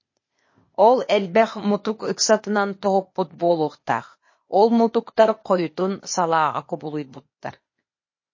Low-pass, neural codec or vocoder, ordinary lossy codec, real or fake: 7.2 kHz; codec, 16 kHz, 0.8 kbps, ZipCodec; MP3, 32 kbps; fake